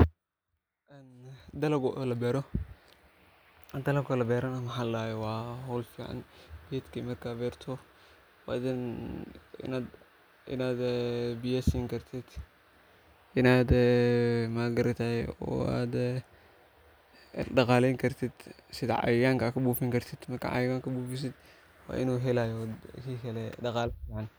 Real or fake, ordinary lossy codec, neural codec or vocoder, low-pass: real; none; none; none